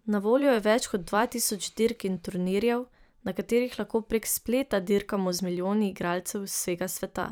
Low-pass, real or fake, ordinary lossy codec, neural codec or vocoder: none; fake; none; vocoder, 44.1 kHz, 128 mel bands every 512 samples, BigVGAN v2